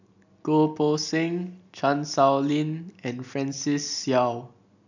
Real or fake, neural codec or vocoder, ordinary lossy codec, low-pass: real; none; none; 7.2 kHz